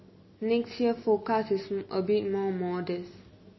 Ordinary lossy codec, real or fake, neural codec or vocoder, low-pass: MP3, 24 kbps; real; none; 7.2 kHz